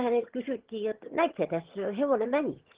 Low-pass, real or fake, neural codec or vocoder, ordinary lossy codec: 3.6 kHz; fake; vocoder, 22.05 kHz, 80 mel bands, HiFi-GAN; Opus, 16 kbps